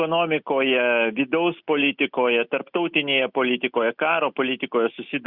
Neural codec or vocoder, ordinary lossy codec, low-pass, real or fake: none; Opus, 64 kbps; 5.4 kHz; real